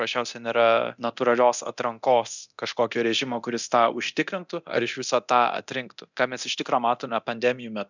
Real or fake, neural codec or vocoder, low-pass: fake; codec, 24 kHz, 0.9 kbps, DualCodec; 7.2 kHz